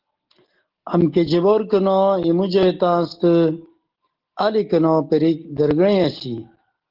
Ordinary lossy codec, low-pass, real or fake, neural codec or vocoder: Opus, 16 kbps; 5.4 kHz; real; none